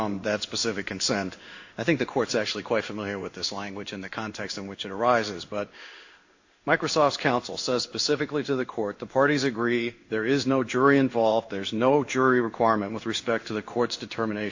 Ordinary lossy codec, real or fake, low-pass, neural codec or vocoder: AAC, 48 kbps; fake; 7.2 kHz; codec, 16 kHz in and 24 kHz out, 1 kbps, XY-Tokenizer